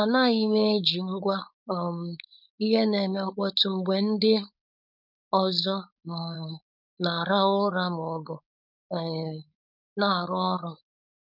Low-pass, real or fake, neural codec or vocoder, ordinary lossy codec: 5.4 kHz; fake; codec, 16 kHz, 4.8 kbps, FACodec; none